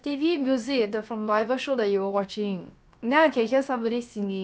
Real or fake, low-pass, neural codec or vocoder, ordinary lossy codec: fake; none; codec, 16 kHz, about 1 kbps, DyCAST, with the encoder's durations; none